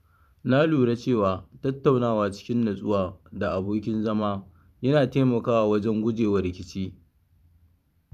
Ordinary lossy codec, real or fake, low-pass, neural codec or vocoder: none; fake; 14.4 kHz; vocoder, 44.1 kHz, 128 mel bands every 512 samples, BigVGAN v2